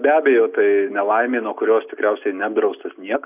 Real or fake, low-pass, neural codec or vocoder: real; 3.6 kHz; none